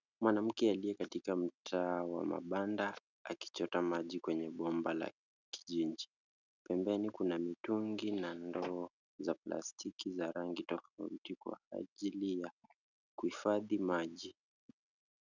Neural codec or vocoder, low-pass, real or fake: none; 7.2 kHz; real